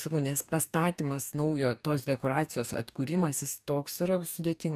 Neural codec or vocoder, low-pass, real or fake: codec, 44.1 kHz, 2.6 kbps, DAC; 14.4 kHz; fake